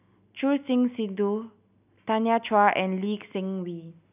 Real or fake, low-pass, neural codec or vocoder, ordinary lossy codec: real; 3.6 kHz; none; none